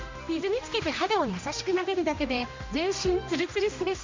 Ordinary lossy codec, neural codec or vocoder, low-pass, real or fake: MP3, 48 kbps; codec, 16 kHz, 1 kbps, X-Codec, HuBERT features, trained on general audio; 7.2 kHz; fake